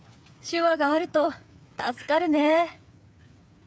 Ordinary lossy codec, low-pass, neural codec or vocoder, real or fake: none; none; codec, 16 kHz, 8 kbps, FreqCodec, smaller model; fake